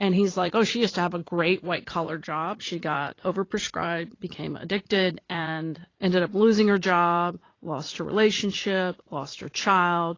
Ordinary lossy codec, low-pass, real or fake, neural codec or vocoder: AAC, 32 kbps; 7.2 kHz; fake; vocoder, 44.1 kHz, 80 mel bands, Vocos